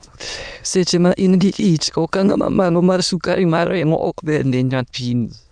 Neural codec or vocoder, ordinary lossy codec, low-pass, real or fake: autoencoder, 22.05 kHz, a latent of 192 numbers a frame, VITS, trained on many speakers; none; 9.9 kHz; fake